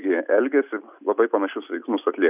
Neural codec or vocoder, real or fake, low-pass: none; real; 3.6 kHz